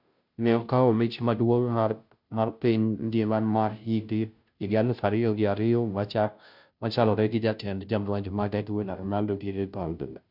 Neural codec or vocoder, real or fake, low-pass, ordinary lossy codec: codec, 16 kHz, 0.5 kbps, FunCodec, trained on Chinese and English, 25 frames a second; fake; 5.4 kHz; none